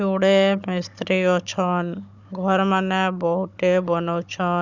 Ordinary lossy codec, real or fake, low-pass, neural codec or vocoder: none; fake; 7.2 kHz; codec, 16 kHz, 16 kbps, FunCodec, trained on Chinese and English, 50 frames a second